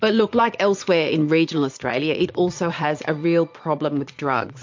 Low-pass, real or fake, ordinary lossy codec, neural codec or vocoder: 7.2 kHz; fake; MP3, 48 kbps; vocoder, 22.05 kHz, 80 mel bands, Vocos